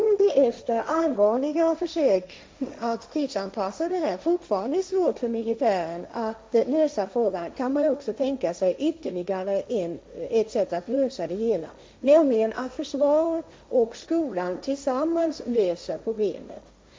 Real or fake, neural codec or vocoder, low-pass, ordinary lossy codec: fake; codec, 16 kHz, 1.1 kbps, Voila-Tokenizer; none; none